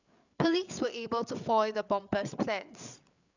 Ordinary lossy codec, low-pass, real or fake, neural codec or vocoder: none; 7.2 kHz; fake; codec, 16 kHz, 8 kbps, FreqCodec, larger model